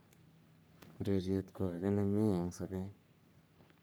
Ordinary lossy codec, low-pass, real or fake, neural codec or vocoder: none; none; fake; codec, 44.1 kHz, 3.4 kbps, Pupu-Codec